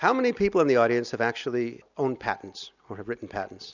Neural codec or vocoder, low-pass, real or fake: none; 7.2 kHz; real